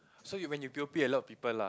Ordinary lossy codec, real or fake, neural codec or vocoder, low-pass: none; real; none; none